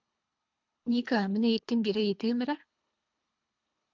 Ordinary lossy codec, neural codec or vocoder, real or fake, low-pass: MP3, 64 kbps; codec, 24 kHz, 3 kbps, HILCodec; fake; 7.2 kHz